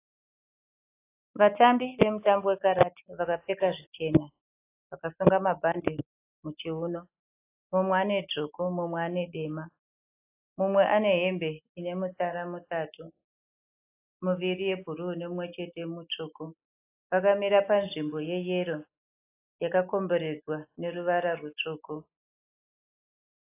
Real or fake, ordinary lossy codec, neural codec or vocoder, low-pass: real; AAC, 24 kbps; none; 3.6 kHz